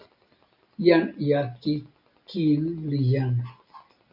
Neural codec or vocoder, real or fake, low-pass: none; real; 5.4 kHz